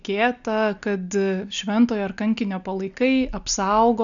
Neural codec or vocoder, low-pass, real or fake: none; 7.2 kHz; real